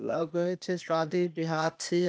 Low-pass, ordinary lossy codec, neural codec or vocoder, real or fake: none; none; codec, 16 kHz, 0.8 kbps, ZipCodec; fake